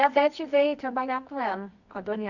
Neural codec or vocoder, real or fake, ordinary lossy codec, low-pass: codec, 24 kHz, 0.9 kbps, WavTokenizer, medium music audio release; fake; none; 7.2 kHz